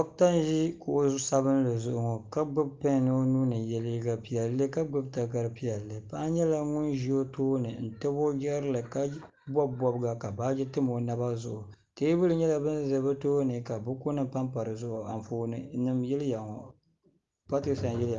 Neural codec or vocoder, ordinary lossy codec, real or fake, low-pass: none; Opus, 32 kbps; real; 7.2 kHz